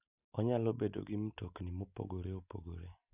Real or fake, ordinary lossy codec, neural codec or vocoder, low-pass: real; none; none; 3.6 kHz